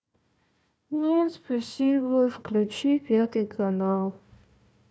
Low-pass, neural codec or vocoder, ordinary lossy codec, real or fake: none; codec, 16 kHz, 1 kbps, FunCodec, trained on Chinese and English, 50 frames a second; none; fake